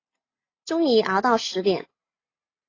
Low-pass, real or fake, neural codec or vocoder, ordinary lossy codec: 7.2 kHz; real; none; AAC, 32 kbps